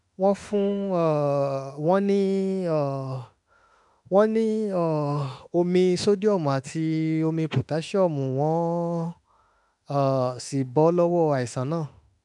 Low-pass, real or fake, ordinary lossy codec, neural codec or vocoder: 10.8 kHz; fake; none; autoencoder, 48 kHz, 32 numbers a frame, DAC-VAE, trained on Japanese speech